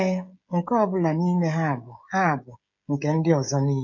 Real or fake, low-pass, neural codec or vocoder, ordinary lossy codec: fake; 7.2 kHz; codec, 16 kHz, 16 kbps, FreqCodec, smaller model; none